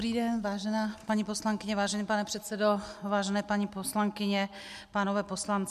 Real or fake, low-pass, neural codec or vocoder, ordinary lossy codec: real; 14.4 kHz; none; MP3, 96 kbps